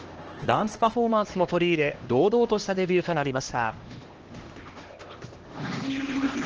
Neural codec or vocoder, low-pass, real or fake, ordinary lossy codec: codec, 16 kHz, 1 kbps, X-Codec, HuBERT features, trained on LibriSpeech; 7.2 kHz; fake; Opus, 16 kbps